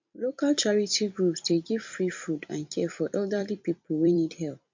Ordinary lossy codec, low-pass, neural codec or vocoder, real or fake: MP3, 64 kbps; 7.2 kHz; vocoder, 44.1 kHz, 128 mel bands every 256 samples, BigVGAN v2; fake